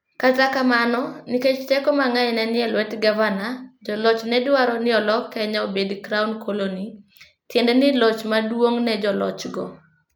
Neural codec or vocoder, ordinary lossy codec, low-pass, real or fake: none; none; none; real